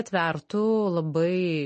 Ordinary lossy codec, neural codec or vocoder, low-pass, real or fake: MP3, 32 kbps; none; 10.8 kHz; real